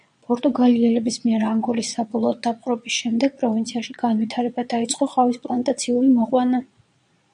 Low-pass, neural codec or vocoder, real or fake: 9.9 kHz; vocoder, 22.05 kHz, 80 mel bands, Vocos; fake